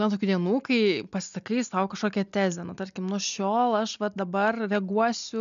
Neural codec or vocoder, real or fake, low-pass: none; real; 7.2 kHz